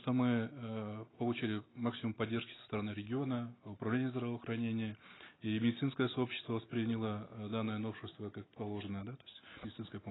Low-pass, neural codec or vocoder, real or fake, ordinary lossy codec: 7.2 kHz; none; real; AAC, 16 kbps